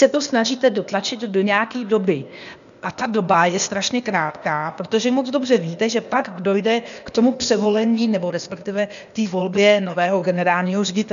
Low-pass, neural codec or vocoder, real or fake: 7.2 kHz; codec, 16 kHz, 0.8 kbps, ZipCodec; fake